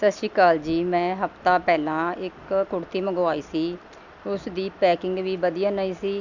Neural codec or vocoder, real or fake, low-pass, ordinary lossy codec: none; real; 7.2 kHz; none